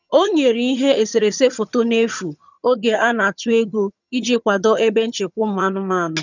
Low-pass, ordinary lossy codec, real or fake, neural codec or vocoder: 7.2 kHz; none; fake; vocoder, 22.05 kHz, 80 mel bands, HiFi-GAN